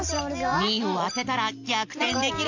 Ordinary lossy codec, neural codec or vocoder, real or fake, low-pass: AAC, 48 kbps; none; real; 7.2 kHz